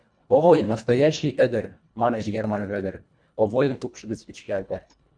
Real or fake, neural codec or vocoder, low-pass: fake; codec, 24 kHz, 1.5 kbps, HILCodec; 9.9 kHz